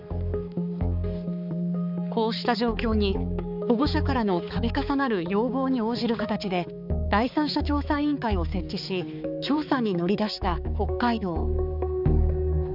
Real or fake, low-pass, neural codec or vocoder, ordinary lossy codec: fake; 5.4 kHz; codec, 16 kHz, 4 kbps, X-Codec, HuBERT features, trained on balanced general audio; none